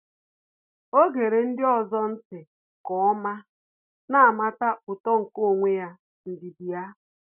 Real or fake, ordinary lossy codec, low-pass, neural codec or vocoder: real; none; 3.6 kHz; none